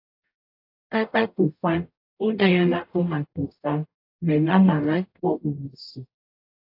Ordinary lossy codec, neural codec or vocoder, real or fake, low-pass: AAC, 32 kbps; codec, 44.1 kHz, 0.9 kbps, DAC; fake; 5.4 kHz